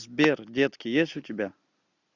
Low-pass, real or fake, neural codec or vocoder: 7.2 kHz; real; none